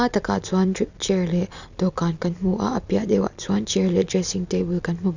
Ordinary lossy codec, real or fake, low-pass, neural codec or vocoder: none; real; 7.2 kHz; none